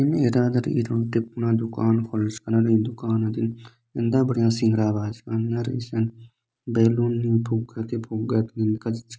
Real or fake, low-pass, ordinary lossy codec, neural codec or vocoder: real; none; none; none